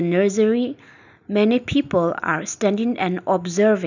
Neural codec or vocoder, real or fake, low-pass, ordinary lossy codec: none; real; 7.2 kHz; none